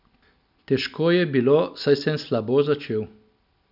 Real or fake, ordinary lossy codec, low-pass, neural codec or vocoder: real; none; 5.4 kHz; none